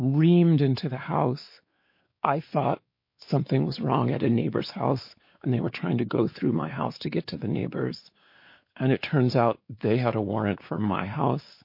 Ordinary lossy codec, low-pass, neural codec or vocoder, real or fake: MP3, 32 kbps; 5.4 kHz; codec, 16 kHz, 4 kbps, X-Codec, WavLM features, trained on Multilingual LibriSpeech; fake